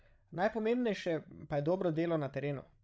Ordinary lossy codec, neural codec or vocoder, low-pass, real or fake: none; codec, 16 kHz, 16 kbps, FreqCodec, larger model; none; fake